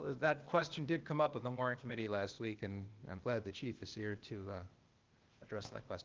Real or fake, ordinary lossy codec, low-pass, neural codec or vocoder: fake; Opus, 32 kbps; 7.2 kHz; codec, 16 kHz, 0.8 kbps, ZipCodec